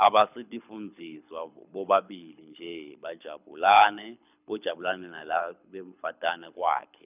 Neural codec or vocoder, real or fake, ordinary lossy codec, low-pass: codec, 24 kHz, 6 kbps, HILCodec; fake; none; 3.6 kHz